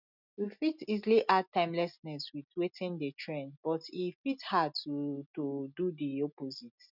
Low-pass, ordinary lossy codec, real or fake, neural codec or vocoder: 5.4 kHz; none; real; none